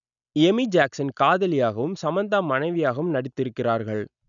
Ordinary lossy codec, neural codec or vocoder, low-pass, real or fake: none; none; 7.2 kHz; real